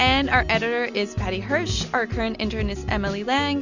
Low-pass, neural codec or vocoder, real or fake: 7.2 kHz; none; real